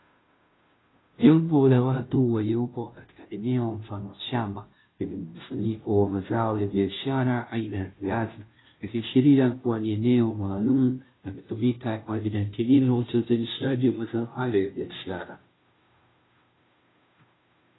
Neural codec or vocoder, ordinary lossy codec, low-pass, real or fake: codec, 16 kHz, 0.5 kbps, FunCodec, trained on Chinese and English, 25 frames a second; AAC, 16 kbps; 7.2 kHz; fake